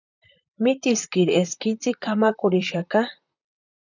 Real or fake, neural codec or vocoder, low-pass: fake; vocoder, 44.1 kHz, 128 mel bands, Pupu-Vocoder; 7.2 kHz